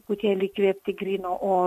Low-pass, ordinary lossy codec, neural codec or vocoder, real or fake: 14.4 kHz; MP3, 64 kbps; none; real